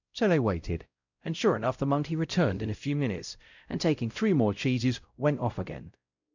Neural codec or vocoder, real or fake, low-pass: codec, 16 kHz, 0.5 kbps, X-Codec, WavLM features, trained on Multilingual LibriSpeech; fake; 7.2 kHz